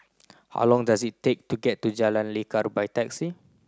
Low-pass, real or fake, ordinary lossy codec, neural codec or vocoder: none; real; none; none